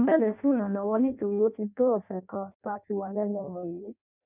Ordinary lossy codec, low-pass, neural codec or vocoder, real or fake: none; 3.6 kHz; codec, 16 kHz in and 24 kHz out, 0.6 kbps, FireRedTTS-2 codec; fake